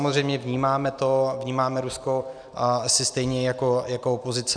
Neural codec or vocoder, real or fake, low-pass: none; real; 9.9 kHz